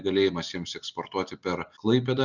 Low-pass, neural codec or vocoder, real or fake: 7.2 kHz; none; real